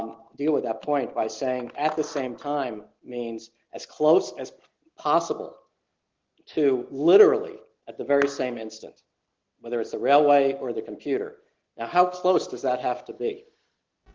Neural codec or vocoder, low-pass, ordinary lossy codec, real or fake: none; 7.2 kHz; Opus, 16 kbps; real